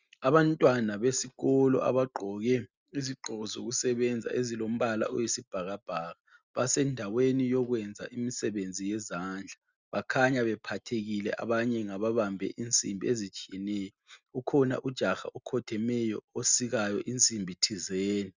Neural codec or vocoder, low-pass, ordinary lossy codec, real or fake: none; 7.2 kHz; Opus, 64 kbps; real